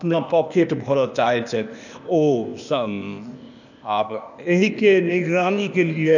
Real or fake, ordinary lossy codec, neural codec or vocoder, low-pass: fake; none; codec, 16 kHz, 0.8 kbps, ZipCodec; 7.2 kHz